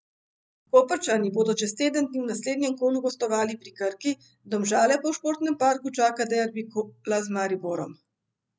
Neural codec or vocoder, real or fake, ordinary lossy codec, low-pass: none; real; none; none